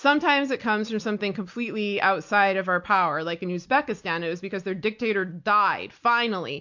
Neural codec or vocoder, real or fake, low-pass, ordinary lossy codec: none; real; 7.2 kHz; MP3, 48 kbps